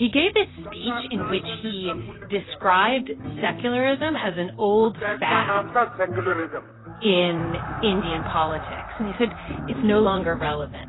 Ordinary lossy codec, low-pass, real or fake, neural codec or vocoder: AAC, 16 kbps; 7.2 kHz; fake; vocoder, 44.1 kHz, 128 mel bands, Pupu-Vocoder